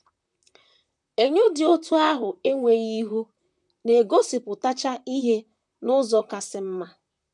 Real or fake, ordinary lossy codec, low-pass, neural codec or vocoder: fake; none; 10.8 kHz; vocoder, 44.1 kHz, 128 mel bands, Pupu-Vocoder